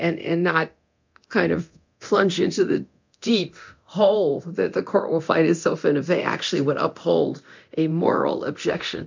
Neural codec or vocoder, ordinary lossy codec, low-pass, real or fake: codec, 24 kHz, 0.9 kbps, DualCodec; MP3, 64 kbps; 7.2 kHz; fake